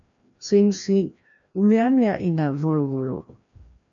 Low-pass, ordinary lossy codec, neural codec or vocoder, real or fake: 7.2 kHz; AAC, 64 kbps; codec, 16 kHz, 1 kbps, FreqCodec, larger model; fake